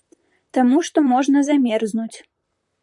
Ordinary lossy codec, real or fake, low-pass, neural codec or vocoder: MP3, 96 kbps; fake; 10.8 kHz; vocoder, 44.1 kHz, 128 mel bands, Pupu-Vocoder